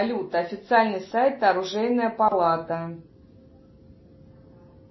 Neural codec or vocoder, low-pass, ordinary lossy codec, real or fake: none; 7.2 kHz; MP3, 24 kbps; real